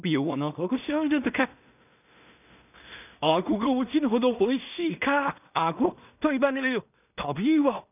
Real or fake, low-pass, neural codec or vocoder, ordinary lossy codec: fake; 3.6 kHz; codec, 16 kHz in and 24 kHz out, 0.4 kbps, LongCat-Audio-Codec, two codebook decoder; none